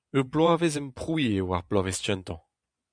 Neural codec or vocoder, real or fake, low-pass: vocoder, 24 kHz, 100 mel bands, Vocos; fake; 9.9 kHz